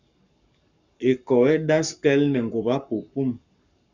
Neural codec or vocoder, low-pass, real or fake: codec, 44.1 kHz, 7.8 kbps, Pupu-Codec; 7.2 kHz; fake